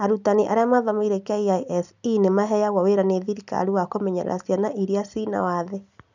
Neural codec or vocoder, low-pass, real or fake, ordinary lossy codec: none; 7.2 kHz; real; none